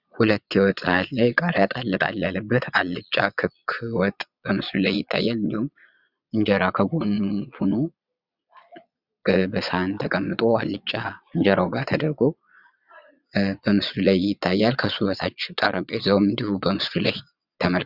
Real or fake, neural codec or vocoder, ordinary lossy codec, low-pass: fake; vocoder, 22.05 kHz, 80 mel bands, Vocos; Opus, 64 kbps; 5.4 kHz